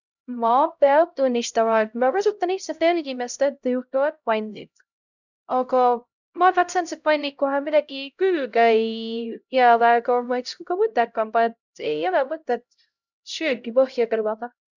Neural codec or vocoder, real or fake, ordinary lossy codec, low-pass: codec, 16 kHz, 0.5 kbps, X-Codec, HuBERT features, trained on LibriSpeech; fake; none; 7.2 kHz